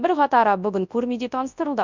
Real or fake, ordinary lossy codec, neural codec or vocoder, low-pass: fake; none; codec, 24 kHz, 0.9 kbps, WavTokenizer, large speech release; 7.2 kHz